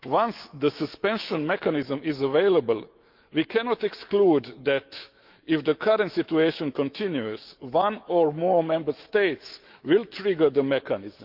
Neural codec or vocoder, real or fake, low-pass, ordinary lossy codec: autoencoder, 48 kHz, 128 numbers a frame, DAC-VAE, trained on Japanese speech; fake; 5.4 kHz; Opus, 32 kbps